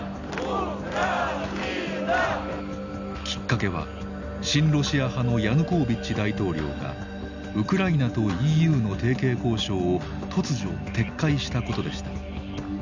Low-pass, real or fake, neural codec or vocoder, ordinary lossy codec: 7.2 kHz; real; none; none